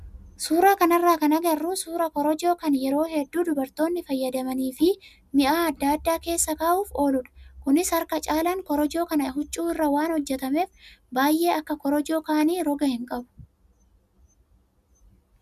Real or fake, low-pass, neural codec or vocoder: real; 14.4 kHz; none